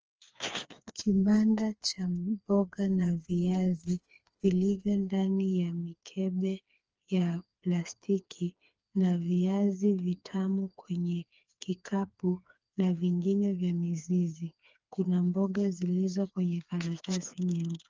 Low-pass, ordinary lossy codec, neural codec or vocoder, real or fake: 7.2 kHz; Opus, 24 kbps; codec, 16 kHz, 4 kbps, FreqCodec, smaller model; fake